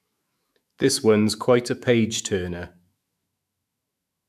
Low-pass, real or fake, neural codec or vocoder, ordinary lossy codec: 14.4 kHz; fake; autoencoder, 48 kHz, 128 numbers a frame, DAC-VAE, trained on Japanese speech; none